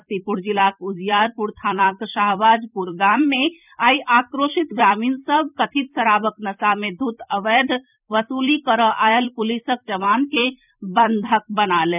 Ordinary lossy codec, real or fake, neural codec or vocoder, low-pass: none; fake; vocoder, 44.1 kHz, 128 mel bands every 256 samples, BigVGAN v2; 3.6 kHz